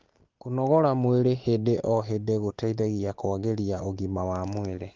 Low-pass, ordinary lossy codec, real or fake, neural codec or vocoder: 7.2 kHz; Opus, 16 kbps; real; none